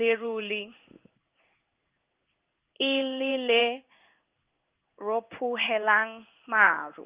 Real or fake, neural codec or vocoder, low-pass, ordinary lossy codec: fake; codec, 16 kHz in and 24 kHz out, 1 kbps, XY-Tokenizer; 3.6 kHz; Opus, 32 kbps